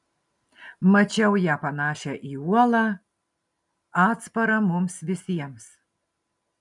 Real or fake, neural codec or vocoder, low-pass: real; none; 10.8 kHz